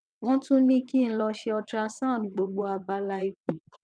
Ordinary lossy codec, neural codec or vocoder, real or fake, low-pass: none; vocoder, 22.05 kHz, 80 mel bands, WaveNeXt; fake; 9.9 kHz